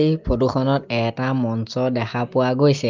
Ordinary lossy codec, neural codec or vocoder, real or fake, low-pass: Opus, 32 kbps; none; real; 7.2 kHz